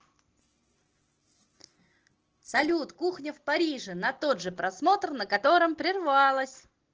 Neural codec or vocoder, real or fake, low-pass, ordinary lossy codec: none; real; 7.2 kHz; Opus, 16 kbps